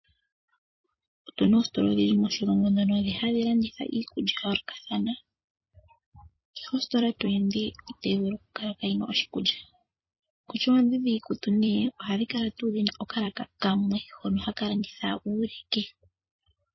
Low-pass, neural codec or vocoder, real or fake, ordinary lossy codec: 7.2 kHz; vocoder, 44.1 kHz, 128 mel bands every 256 samples, BigVGAN v2; fake; MP3, 24 kbps